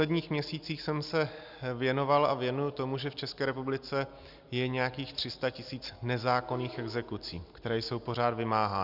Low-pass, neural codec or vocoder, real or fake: 5.4 kHz; none; real